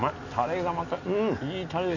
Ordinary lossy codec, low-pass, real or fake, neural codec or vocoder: none; 7.2 kHz; real; none